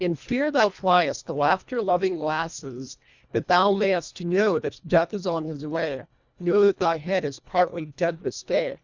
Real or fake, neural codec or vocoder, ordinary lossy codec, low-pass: fake; codec, 24 kHz, 1.5 kbps, HILCodec; Opus, 64 kbps; 7.2 kHz